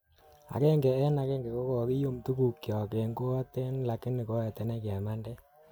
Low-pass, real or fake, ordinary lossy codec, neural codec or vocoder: none; real; none; none